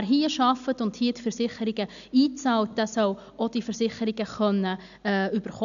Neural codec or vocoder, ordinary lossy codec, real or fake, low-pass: none; none; real; 7.2 kHz